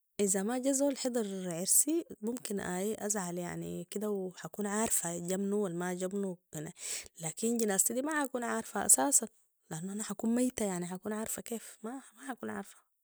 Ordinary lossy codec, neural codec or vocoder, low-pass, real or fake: none; none; none; real